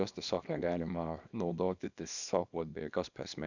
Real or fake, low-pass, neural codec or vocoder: fake; 7.2 kHz; codec, 24 kHz, 0.9 kbps, WavTokenizer, small release